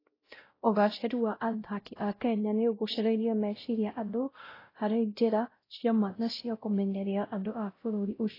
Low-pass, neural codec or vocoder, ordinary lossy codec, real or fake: 5.4 kHz; codec, 16 kHz, 0.5 kbps, X-Codec, WavLM features, trained on Multilingual LibriSpeech; AAC, 24 kbps; fake